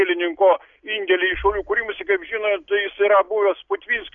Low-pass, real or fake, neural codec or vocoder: 7.2 kHz; real; none